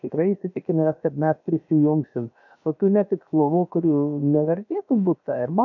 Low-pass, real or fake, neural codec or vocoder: 7.2 kHz; fake; codec, 16 kHz, about 1 kbps, DyCAST, with the encoder's durations